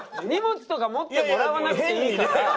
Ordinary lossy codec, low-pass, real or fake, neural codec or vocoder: none; none; real; none